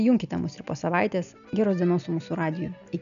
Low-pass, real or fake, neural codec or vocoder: 7.2 kHz; real; none